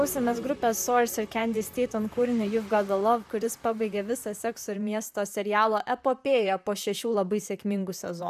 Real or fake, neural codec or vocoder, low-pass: fake; vocoder, 44.1 kHz, 128 mel bands, Pupu-Vocoder; 14.4 kHz